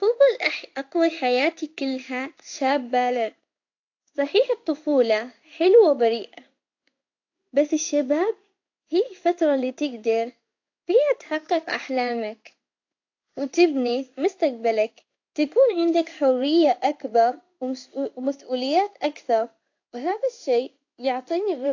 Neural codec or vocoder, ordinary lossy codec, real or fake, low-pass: codec, 16 kHz in and 24 kHz out, 1 kbps, XY-Tokenizer; AAC, 48 kbps; fake; 7.2 kHz